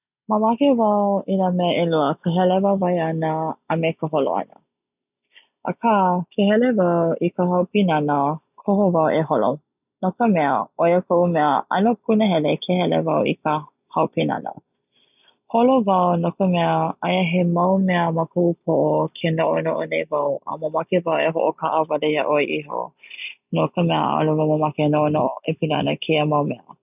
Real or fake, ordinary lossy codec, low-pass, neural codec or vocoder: real; none; 3.6 kHz; none